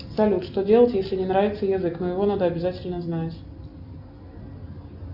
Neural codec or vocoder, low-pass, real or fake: none; 5.4 kHz; real